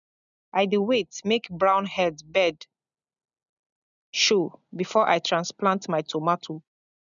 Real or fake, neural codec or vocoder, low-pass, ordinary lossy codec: real; none; 7.2 kHz; none